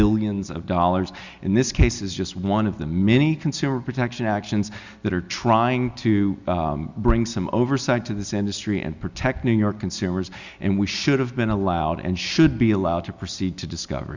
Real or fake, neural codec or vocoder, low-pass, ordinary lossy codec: real; none; 7.2 kHz; Opus, 64 kbps